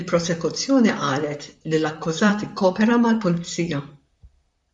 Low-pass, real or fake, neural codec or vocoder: 10.8 kHz; fake; vocoder, 44.1 kHz, 128 mel bands, Pupu-Vocoder